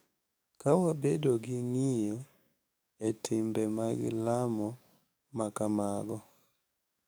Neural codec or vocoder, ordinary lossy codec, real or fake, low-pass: codec, 44.1 kHz, 7.8 kbps, DAC; none; fake; none